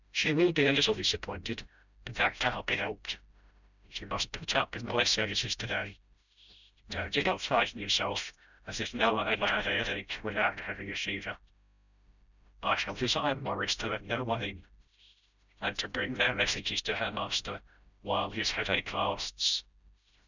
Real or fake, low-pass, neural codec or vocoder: fake; 7.2 kHz; codec, 16 kHz, 0.5 kbps, FreqCodec, smaller model